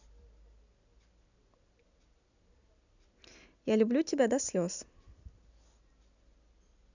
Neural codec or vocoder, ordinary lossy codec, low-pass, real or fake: none; none; 7.2 kHz; real